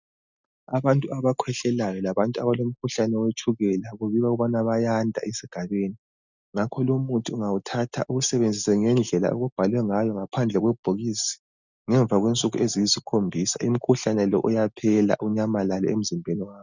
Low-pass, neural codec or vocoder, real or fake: 7.2 kHz; none; real